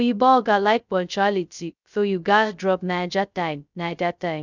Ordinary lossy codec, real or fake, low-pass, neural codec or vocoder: none; fake; 7.2 kHz; codec, 16 kHz, 0.2 kbps, FocalCodec